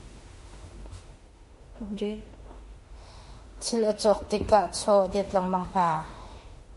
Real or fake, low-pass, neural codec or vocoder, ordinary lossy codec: fake; 14.4 kHz; autoencoder, 48 kHz, 32 numbers a frame, DAC-VAE, trained on Japanese speech; MP3, 48 kbps